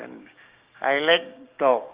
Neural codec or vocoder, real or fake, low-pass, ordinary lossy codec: codec, 16 kHz, 6 kbps, DAC; fake; 3.6 kHz; Opus, 32 kbps